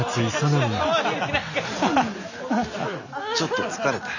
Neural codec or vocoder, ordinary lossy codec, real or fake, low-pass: none; none; real; 7.2 kHz